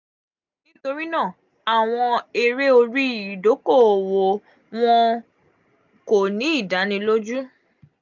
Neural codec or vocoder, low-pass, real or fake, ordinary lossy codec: none; 7.2 kHz; real; none